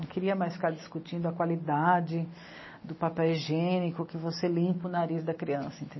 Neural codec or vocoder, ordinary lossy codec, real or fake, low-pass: none; MP3, 24 kbps; real; 7.2 kHz